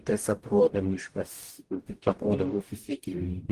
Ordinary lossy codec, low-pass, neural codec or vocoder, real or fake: Opus, 24 kbps; 14.4 kHz; codec, 44.1 kHz, 0.9 kbps, DAC; fake